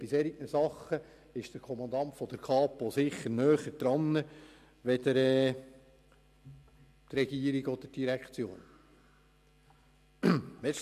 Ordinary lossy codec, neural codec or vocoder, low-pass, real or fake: AAC, 96 kbps; none; 14.4 kHz; real